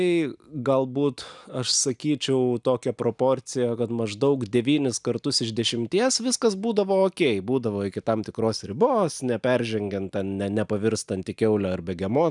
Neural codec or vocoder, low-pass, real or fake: none; 10.8 kHz; real